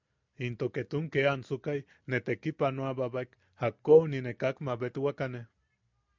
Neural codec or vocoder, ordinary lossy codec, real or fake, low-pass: none; MP3, 64 kbps; real; 7.2 kHz